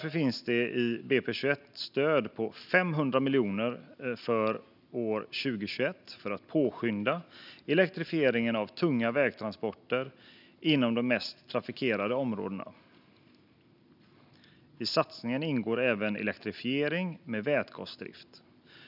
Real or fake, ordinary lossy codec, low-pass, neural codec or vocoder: real; none; 5.4 kHz; none